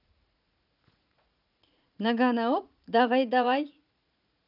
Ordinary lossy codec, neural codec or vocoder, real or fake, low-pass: none; vocoder, 44.1 kHz, 80 mel bands, Vocos; fake; 5.4 kHz